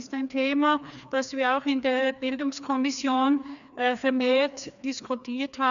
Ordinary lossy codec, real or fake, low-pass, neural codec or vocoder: none; fake; 7.2 kHz; codec, 16 kHz, 2 kbps, FreqCodec, larger model